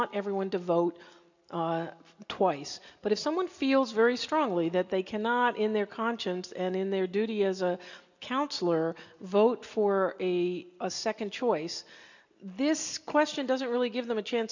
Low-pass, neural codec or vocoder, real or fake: 7.2 kHz; none; real